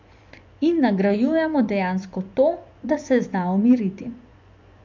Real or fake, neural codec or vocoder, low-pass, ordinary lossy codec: fake; vocoder, 24 kHz, 100 mel bands, Vocos; 7.2 kHz; MP3, 64 kbps